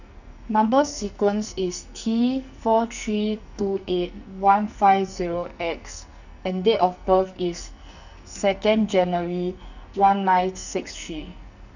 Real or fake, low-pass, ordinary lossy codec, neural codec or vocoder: fake; 7.2 kHz; none; codec, 44.1 kHz, 2.6 kbps, SNAC